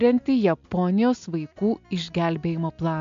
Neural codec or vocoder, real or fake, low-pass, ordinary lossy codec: none; real; 7.2 kHz; AAC, 64 kbps